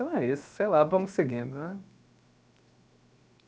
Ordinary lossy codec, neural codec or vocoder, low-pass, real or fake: none; codec, 16 kHz, 0.7 kbps, FocalCodec; none; fake